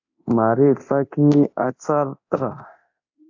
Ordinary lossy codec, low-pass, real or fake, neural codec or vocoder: AAC, 48 kbps; 7.2 kHz; fake; codec, 24 kHz, 0.9 kbps, DualCodec